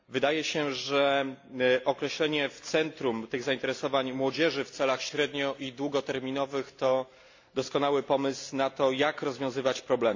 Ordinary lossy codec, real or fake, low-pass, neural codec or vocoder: AAC, 48 kbps; real; 7.2 kHz; none